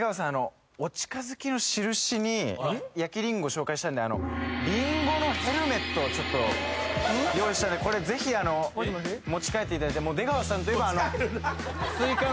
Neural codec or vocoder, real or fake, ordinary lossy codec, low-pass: none; real; none; none